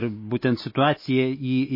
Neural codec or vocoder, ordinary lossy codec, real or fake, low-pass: none; MP3, 24 kbps; real; 5.4 kHz